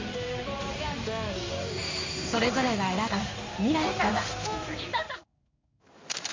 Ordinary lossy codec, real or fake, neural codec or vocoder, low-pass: MP3, 64 kbps; fake; codec, 16 kHz in and 24 kHz out, 1 kbps, XY-Tokenizer; 7.2 kHz